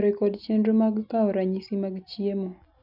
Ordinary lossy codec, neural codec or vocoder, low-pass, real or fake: none; none; 5.4 kHz; real